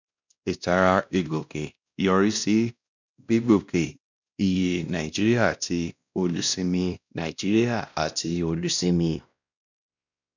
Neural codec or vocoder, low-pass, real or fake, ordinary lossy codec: codec, 16 kHz, 1 kbps, X-Codec, WavLM features, trained on Multilingual LibriSpeech; 7.2 kHz; fake; none